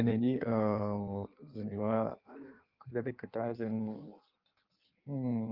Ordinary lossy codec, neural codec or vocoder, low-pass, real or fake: Opus, 24 kbps; codec, 16 kHz in and 24 kHz out, 1.1 kbps, FireRedTTS-2 codec; 5.4 kHz; fake